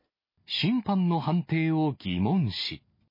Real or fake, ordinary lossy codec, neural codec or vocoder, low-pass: real; MP3, 24 kbps; none; 5.4 kHz